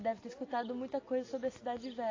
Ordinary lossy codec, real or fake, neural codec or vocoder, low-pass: AAC, 32 kbps; real; none; 7.2 kHz